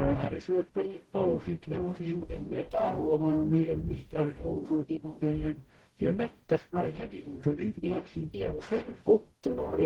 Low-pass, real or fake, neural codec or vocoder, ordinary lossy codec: 19.8 kHz; fake; codec, 44.1 kHz, 0.9 kbps, DAC; Opus, 16 kbps